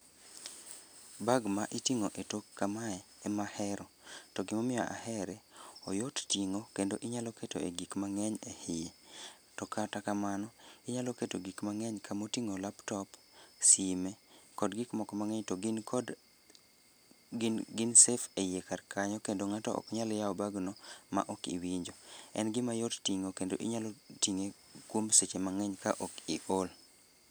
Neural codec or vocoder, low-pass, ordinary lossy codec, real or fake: none; none; none; real